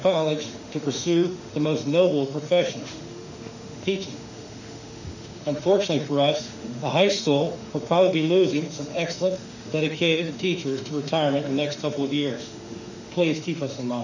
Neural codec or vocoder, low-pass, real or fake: autoencoder, 48 kHz, 32 numbers a frame, DAC-VAE, trained on Japanese speech; 7.2 kHz; fake